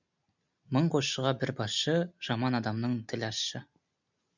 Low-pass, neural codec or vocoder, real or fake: 7.2 kHz; none; real